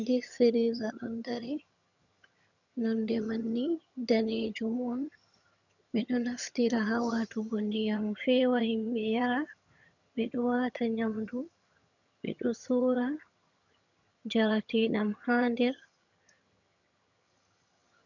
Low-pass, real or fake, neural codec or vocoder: 7.2 kHz; fake; vocoder, 22.05 kHz, 80 mel bands, HiFi-GAN